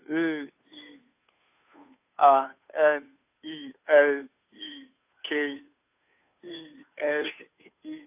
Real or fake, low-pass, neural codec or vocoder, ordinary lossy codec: fake; 3.6 kHz; codec, 16 kHz, 2 kbps, FunCodec, trained on Chinese and English, 25 frames a second; AAC, 32 kbps